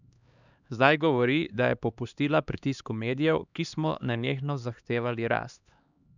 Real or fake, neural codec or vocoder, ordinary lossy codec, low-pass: fake; codec, 16 kHz, 2 kbps, X-Codec, HuBERT features, trained on LibriSpeech; none; 7.2 kHz